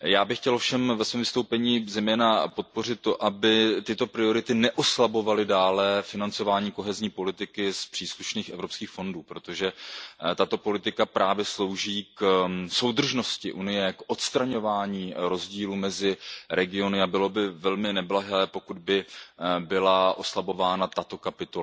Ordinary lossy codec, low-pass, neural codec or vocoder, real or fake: none; none; none; real